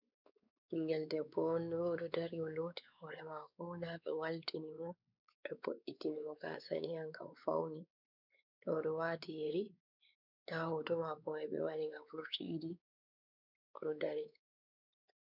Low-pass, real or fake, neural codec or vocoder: 5.4 kHz; fake; codec, 16 kHz, 4 kbps, X-Codec, WavLM features, trained on Multilingual LibriSpeech